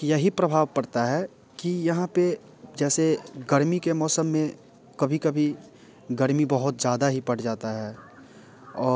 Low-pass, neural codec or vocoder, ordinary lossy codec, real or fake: none; none; none; real